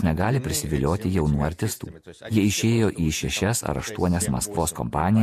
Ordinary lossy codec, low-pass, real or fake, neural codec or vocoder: MP3, 64 kbps; 14.4 kHz; fake; vocoder, 48 kHz, 128 mel bands, Vocos